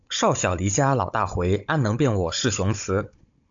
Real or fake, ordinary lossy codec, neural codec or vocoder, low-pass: fake; AAC, 64 kbps; codec, 16 kHz, 16 kbps, FunCodec, trained on Chinese and English, 50 frames a second; 7.2 kHz